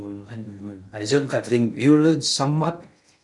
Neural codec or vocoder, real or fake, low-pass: codec, 16 kHz in and 24 kHz out, 0.6 kbps, FocalCodec, streaming, 2048 codes; fake; 10.8 kHz